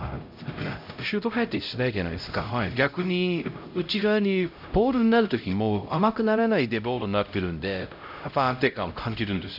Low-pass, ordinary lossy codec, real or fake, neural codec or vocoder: 5.4 kHz; AAC, 48 kbps; fake; codec, 16 kHz, 0.5 kbps, X-Codec, HuBERT features, trained on LibriSpeech